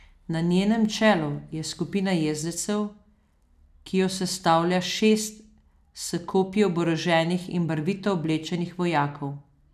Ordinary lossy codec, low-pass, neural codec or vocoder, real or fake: none; 14.4 kHz; none; real